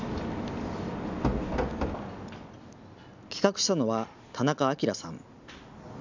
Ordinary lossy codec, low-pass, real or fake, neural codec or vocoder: none; 7.2 kHz; real; none